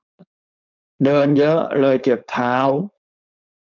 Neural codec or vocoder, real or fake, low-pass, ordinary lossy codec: codec, 16 kHz, 4.8 kbps, FACodec; fake; 7.2 kHz; MP3, 64 kbps